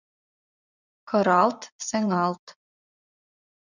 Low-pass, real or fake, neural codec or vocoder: 7.2 kHz; fake; vocoder, 44.1 kHz, 80 mel bands, Vocos